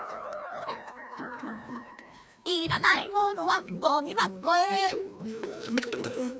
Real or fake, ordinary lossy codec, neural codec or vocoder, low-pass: fake; none; codec, 16 kHz, 1 kbps, FreqCodec, larger model; none